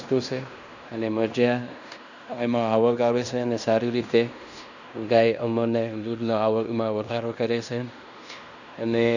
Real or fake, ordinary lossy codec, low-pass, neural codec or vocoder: fake; none; 7.2 kHz; codec, 16 kHz in and 24 kHz out, 0.9 kbps, LongCat-Audio-Codec, fine tuned four codebook decoder